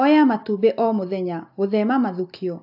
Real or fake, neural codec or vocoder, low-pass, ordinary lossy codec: real; none; 5.4 kHz; AAC, 48 kbps